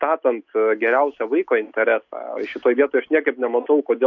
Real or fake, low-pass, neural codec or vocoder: real; 7.2 kHz; none